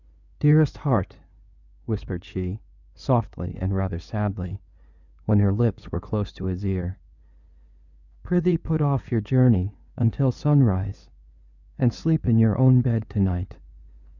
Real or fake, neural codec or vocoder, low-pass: fake; codec, 16 kHz in and 24 kHz out, 2.2 kbps, FireRedTTS-2 codec; 7.2 kHz